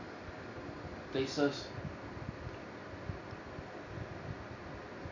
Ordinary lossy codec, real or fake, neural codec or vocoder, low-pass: none; real; none; 7.2 kHz